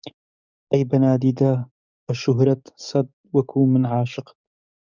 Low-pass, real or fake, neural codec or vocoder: 7.2 kHz; fake; codec, 44.1 kHz, 7.8 kbps, DAC